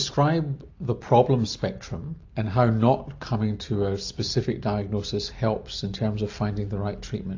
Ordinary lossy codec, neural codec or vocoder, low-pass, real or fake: AAC, 48 kbps; none; 7.2 kHz; real